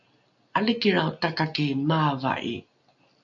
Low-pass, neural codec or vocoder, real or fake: 7.2 kHz; none; real